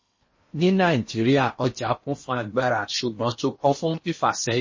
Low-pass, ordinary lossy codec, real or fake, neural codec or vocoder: 7.2 kHz; MP3, 32 kbps; fake; codec, 16 kHz in and 24 kHz out, 0.8 kbps, FocalCodec, streaming, 65536 codes